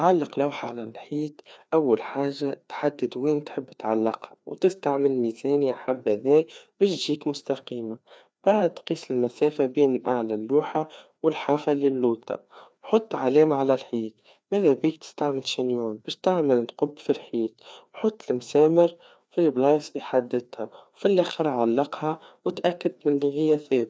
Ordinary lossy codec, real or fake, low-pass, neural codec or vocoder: none; fake; none; codec, 16 kHz, 2 kbps, FreqCodec, larger model